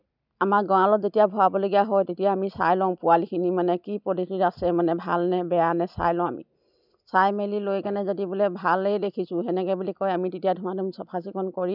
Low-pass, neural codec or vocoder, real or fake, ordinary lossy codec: 5.4 kHz; none; real; none